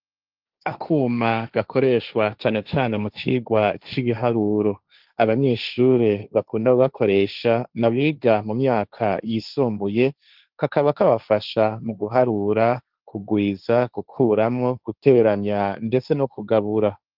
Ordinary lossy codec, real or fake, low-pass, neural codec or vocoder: Opus, 24 kbps; fake; 5.4 kHz; codec, 16 kHz, 1.1 kbps, Voila-Tokenizer